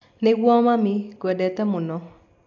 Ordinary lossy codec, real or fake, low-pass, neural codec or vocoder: AAC, 48 kbps; real; 7.2 kHz; none